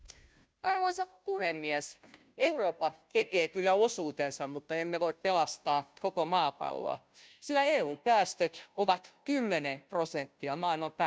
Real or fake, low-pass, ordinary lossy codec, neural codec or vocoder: fake; none; none; codec, 16 kHz, 0.5 kbps, FunCodec, trained on Chinese and English, 25 frames a second